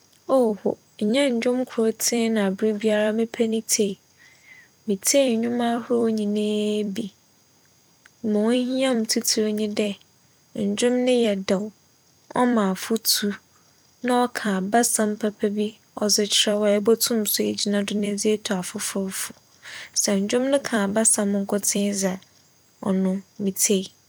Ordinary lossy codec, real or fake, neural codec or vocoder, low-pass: none; fake; vocoder, 44.1 kHz, 128 mel bands every 256 samples, BigVGAN v2; none